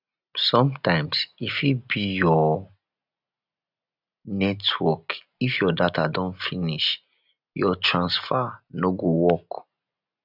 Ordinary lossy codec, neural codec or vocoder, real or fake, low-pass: none; none; real; 5.4 kHz